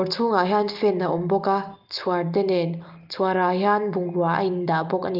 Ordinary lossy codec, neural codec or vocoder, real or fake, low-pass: Opus, 32 kbps; none; real; 5.4 kHz